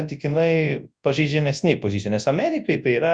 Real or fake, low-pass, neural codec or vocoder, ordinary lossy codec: fake; 9.9 kHz; codec, 24 kHz, 0.9 kbps, WavTokenizer, large speech release; Opus, 64 kbps